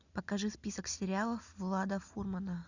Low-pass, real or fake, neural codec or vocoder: 7.2 kHz; real; none